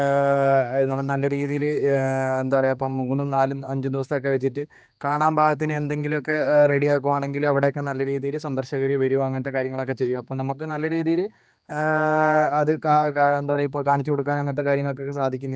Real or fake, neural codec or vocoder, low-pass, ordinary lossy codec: fake; codec, 16 kHz, 2 kbps, X-Codec, HuBERT features, trained on general audio; none; none